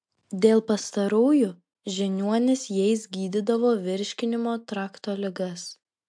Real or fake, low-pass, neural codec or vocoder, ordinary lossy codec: real; 9.9 kHz; none; MP3, 64 kbps